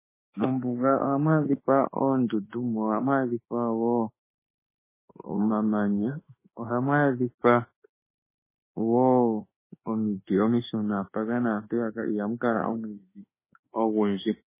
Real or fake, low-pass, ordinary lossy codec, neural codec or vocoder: fake; 3.6 kHz; MP3, 16 kbps; autoencoder, 48 kHz, 32 numbers a frame, DAC-VAE, trained on Japanese speech